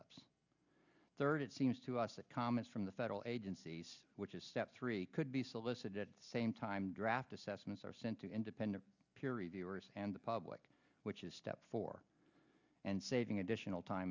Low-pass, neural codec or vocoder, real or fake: 7.2 kHz; none; real